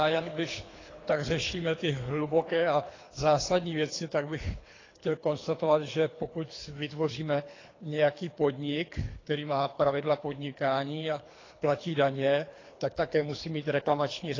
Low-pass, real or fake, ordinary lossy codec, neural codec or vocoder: 7.2 kHz; fake; AAC, 32 kbps; codec, 24 kHz, 3 kbps, HILCodec